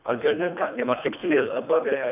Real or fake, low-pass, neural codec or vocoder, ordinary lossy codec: fake; 3.6 kHz; codec, 24 kHz, 1.5 kbps, HILCodec; none